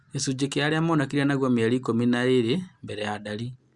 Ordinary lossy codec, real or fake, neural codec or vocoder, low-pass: Opus, 64 kbps; real; none; 10.8 kHz